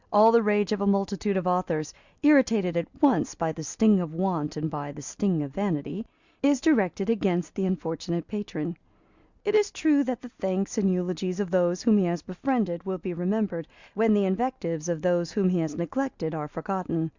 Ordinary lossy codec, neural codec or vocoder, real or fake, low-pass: Opus, 64 kbps; none; real; 7.2 kHz